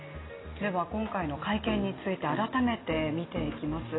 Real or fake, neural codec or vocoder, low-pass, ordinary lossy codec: real; none; 7.2 kHz; AAC, 16 kbps